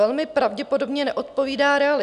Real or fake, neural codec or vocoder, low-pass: real; none; 10.8 kHz